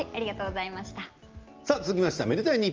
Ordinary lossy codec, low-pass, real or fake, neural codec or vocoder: Opus, 24 kbps; 7.2 kHz; real; none